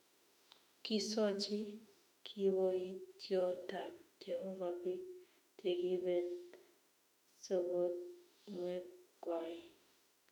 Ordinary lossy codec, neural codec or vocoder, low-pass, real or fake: none; autoencoder, 48 kHz, 32 numbers a frame, DAC-VAE, trained on Japanese speech; 19.8 kHz; fake